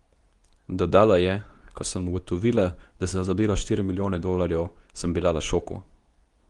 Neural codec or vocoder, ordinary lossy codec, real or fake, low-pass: codec, 24 kHz, 0.9 kbps, WavTokenizer, medium speech release version 2; Opus, 24 kbps; fake; 10.8 kHz